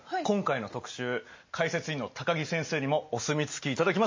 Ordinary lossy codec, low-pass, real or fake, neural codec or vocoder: MP3, 32 kbps; 7.2 kHz; real; none